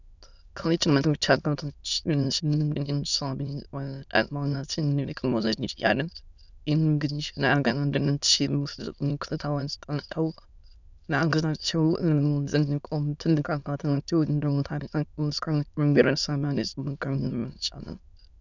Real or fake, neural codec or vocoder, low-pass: fake; autoencoder, 22.05 kHz, a latent of 192 numbers a frame, VITS, trained on many speakers; 7.2 kHz